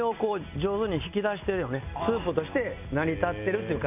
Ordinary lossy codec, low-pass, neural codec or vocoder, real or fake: none; 3.6 kHz; none; real